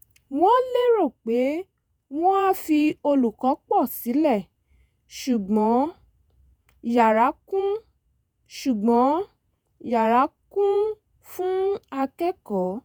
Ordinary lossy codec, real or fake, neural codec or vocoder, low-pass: none; fake; vocoder, 48 kHz, 128 mel bands, Vocos; none